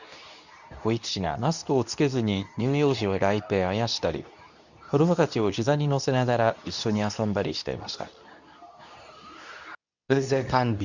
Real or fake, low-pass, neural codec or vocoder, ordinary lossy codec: fake; 7.2 kHz; codec, 24 kHz, 0.9 kbps, WavTokenizer, medium speech release version 2; none